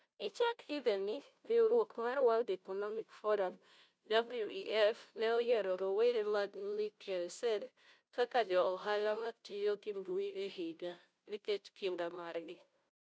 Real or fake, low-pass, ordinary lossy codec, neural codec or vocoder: fake; none; none; codec, 16 kHz, 0.5 kbps, FunCodec, trained on Chinese and English, 25 frames a second